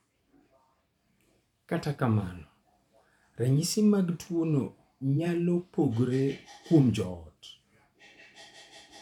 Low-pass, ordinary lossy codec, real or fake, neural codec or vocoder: 19.8 kHz; none; fake; vocoder, 44.1 kHz, 128 mel bands every 512 samples, BigVGAN v2